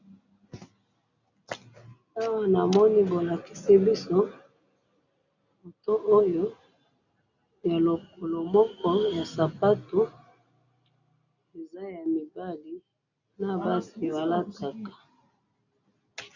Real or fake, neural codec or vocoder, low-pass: real; none; 7.2 kHz